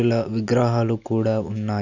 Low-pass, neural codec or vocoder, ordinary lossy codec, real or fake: 7.2 kHz; none; none; real